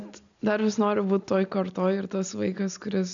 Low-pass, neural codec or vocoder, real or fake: 7.2 kHz; none; real